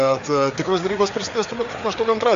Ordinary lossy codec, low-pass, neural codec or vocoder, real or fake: AAC, 64 kbps; 7.2 kHz; codec, 16 kHz, 4 kbps, FreqCodec, larger model; fake